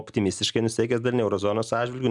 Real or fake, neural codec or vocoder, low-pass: real; none; 10.8 kHz